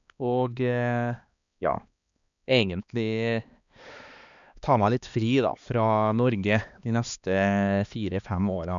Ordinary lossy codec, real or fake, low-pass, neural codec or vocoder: none; fake; 7.2 kHz; codec, 16 kHz, 2 kbps, X-Codec, HuBERT features, trained on balanced general audio